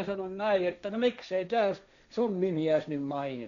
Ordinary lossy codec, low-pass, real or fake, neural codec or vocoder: none; 7.2 kHz; fake; codec, 16 kHz, 1.1 kbps, Voila-Tokenizer